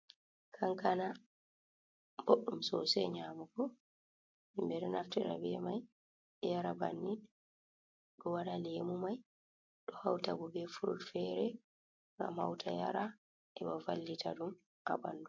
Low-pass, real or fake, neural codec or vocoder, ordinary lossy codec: 7.2 kHz; real; none; MP3, 48 kbps